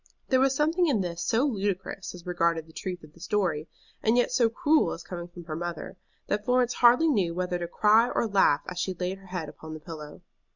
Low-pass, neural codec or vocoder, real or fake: 7.2 kHz; none; real